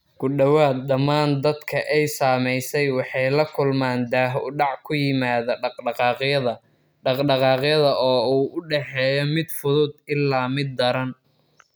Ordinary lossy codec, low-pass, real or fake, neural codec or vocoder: none; none; real; none